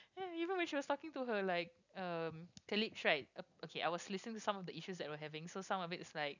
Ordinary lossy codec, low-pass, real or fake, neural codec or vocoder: none; 7.2 kHz; real; none